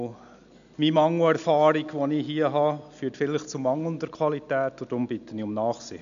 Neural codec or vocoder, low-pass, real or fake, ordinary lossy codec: none; 7.2 kHz; real; none